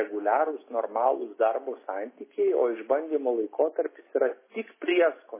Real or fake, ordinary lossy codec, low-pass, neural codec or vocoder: real; MP3, 16 kbps; 3.6 kHz; none